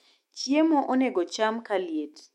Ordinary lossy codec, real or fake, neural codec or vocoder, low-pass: MP3, 64 kbps; fake; autoencoder, 48 kHz, 128 numbers a frame, DAC-VAE, trained on Japanese speech; 19.8 kHz